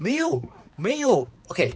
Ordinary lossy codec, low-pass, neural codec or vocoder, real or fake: none; none; codec, 16 kHz, 4 kbps, X-Codec, HuBERT features, trained on balanced general audio; fake